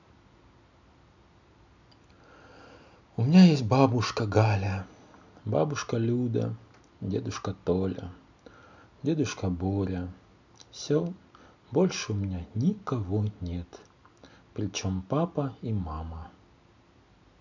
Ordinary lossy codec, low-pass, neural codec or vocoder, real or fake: none; 7.2 kHz; none; real